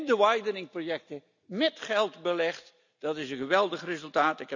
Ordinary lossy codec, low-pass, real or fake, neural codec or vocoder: none; 7.2 kHz; real; none